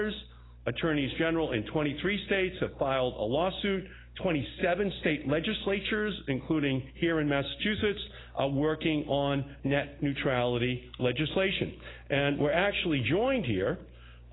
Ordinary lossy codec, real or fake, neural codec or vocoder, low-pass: AAC, 16 kbps; real; none; 7.2 kHz